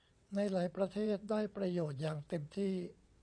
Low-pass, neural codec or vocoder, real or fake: 9.9 kHz; vocoder, 22.05 kHz, 80 mel bands, WaveNeXt; fake